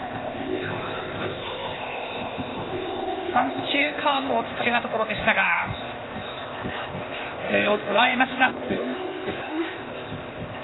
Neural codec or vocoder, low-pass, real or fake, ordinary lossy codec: codec, 16 kHz, 0.8 kbps, ZipCodec; 7.2 kHz; fake; AAC, 16 kbps